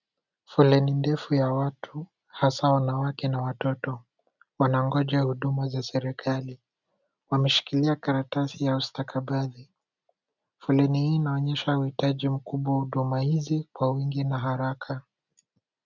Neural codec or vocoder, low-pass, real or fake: none; 7.2 kHz; real